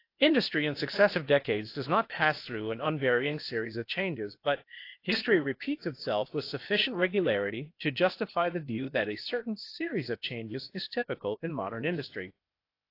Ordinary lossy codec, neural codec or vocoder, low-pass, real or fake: AAC, 32 kbps; codec, 16 kHz, 0.8 kbps, ZipCodec; 5.4 kHz; fake